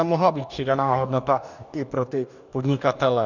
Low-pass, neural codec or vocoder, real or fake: 7.2 kHz; codec, 44.1 kHz, 2.6 kbps, DAC; fake